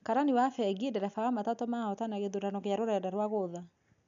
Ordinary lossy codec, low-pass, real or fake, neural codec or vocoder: none; 7.2 kHz; real; none